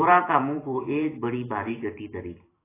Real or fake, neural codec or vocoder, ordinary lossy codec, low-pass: fake; vocoder, 44.1 kHz, 128 mel bands every 512 samples, BigVGAN v2; AAC, 16 kbps; 3.6 kHz